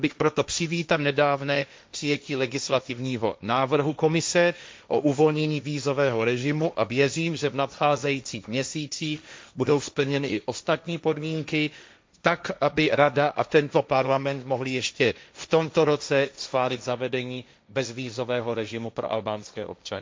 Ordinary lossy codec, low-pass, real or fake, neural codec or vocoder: none; none; fake; codec, 16 kHz, 1.1 kbps, Voila-Tokenizer